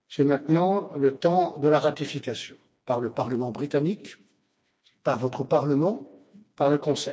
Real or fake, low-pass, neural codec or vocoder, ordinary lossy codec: fake; none; codec, 16 kHz, 2 kbps, FreqCodec, smaller model; none